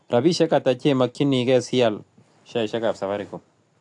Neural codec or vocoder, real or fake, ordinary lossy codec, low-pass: none; real; AAC, 64 kbps; 10.8 kHz